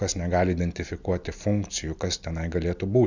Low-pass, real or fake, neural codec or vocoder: 7.2 kHz; real; none